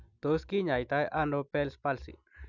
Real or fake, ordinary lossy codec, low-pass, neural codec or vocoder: real; none; 7.2 kHz; none